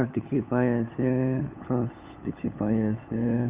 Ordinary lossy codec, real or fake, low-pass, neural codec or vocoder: Opus, 32 kbps; fake; 3.6 kHz; codec, 16 kHz, 8 kbps, FunCodec, trained on LibriTTS, 25 frames a second